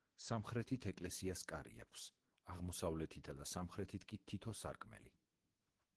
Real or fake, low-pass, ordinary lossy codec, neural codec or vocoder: fake; 10.8 kHz; Opus, 16 kbps; autoencoder, 48 kHz, 128 numbers a frame, DAC-VAE, trained on Japanese speech